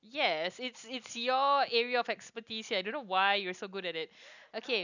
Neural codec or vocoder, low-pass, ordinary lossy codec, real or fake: none; 7.2 kHz; none; real